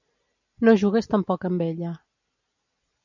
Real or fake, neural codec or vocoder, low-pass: real; none; 7.2 kHz